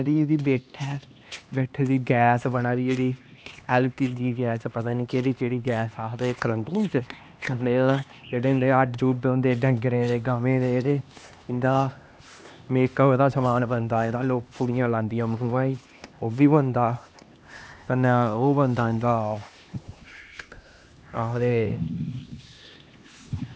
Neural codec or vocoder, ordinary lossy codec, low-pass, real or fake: codec, 16 kHz, 2 kbps, X-Codec, HuBERT features, trained on LibriSpeech; none; none; fake